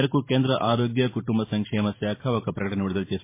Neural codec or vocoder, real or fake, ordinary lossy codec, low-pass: vocoder, 44.1 kHz, 128 mel bands every 512 samples, BigVGAN v2; fake; MP3, 24 kbps; 3.6 kHz